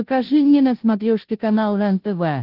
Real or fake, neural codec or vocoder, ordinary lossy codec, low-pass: fake; codec, 16 kHz, 0.5 kbps, FunCodec, trained on Chinese and English, 25 frames a second; Opus, 16 kbps; 5.4 kHz